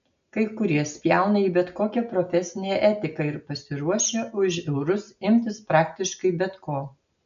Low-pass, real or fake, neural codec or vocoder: 7.2 kHz; real; none